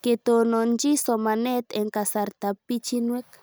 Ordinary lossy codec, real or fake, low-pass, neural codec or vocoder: none; real; none; none